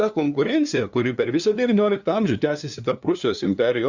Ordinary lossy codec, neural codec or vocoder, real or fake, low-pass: Opus, 64 kbps; codec, 16 kHz, 2 kbps, FunCodec, trained on LibriTTS, 25 frames a second; fake; 7.2 kHz